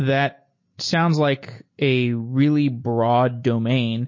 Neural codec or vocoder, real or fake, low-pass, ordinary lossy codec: none; real; 7.2 kHz; MP3, 32 kbps